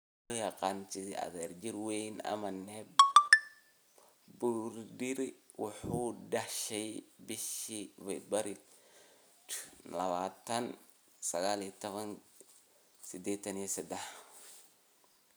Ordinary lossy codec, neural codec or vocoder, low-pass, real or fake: none; none; none; real